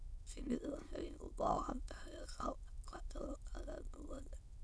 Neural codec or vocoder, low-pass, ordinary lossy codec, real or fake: autoencoder, 22.05 kHz, a latent of 192 numbers a frame, VITS, trained on many speakers; none; none; fake